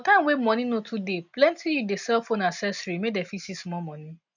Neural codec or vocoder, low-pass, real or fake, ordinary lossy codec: none; 7.2 kHz; real; none